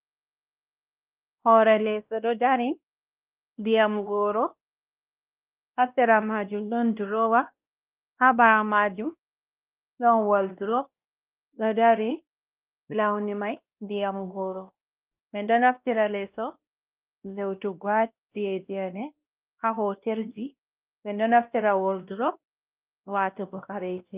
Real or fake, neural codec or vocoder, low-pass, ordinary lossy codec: fake; codec, 16 kHz, 1 kbps, X-Codec, WavLM features, trained on Multilingual LibriSpeech; 3.6 kHz; Opus, 24 kbps